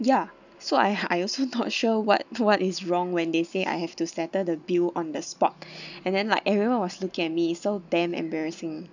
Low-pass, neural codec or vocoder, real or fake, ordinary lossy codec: 7.2 kHz; none; real; none